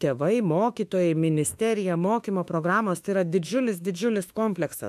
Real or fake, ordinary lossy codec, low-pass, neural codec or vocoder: fake; AAC, 96 kbps; 14.4 kHz; autoencoder, 48 kHz, 32 numbers a frame, DAC-VAE, trained on Japanese speech